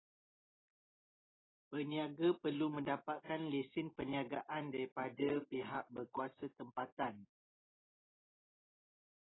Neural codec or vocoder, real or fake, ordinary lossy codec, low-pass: none; real; AAC, 16 kbps; 7.2 kHz